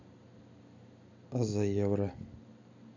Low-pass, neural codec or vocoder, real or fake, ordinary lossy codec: 7.2 kHz; none; real; MP3, 64 kbps